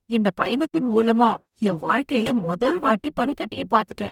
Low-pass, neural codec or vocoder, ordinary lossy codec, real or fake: 19.8 kHz; codec, 44.1 kHz, 0.9 kbps, DAC; none; fake